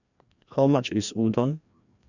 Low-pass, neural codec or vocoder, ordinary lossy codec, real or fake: 7.2 kHz; codec, 16 kHz, 1 kbps, FreqCodec, larger model; none; fake